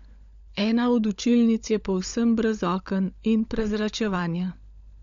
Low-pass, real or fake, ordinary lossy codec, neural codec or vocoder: 7.2 kHz; fake; MP3, 64 kbps; codec, 16 kHz, 16 kbps, FunCodec, trained on LibriTTS, 50 frames a second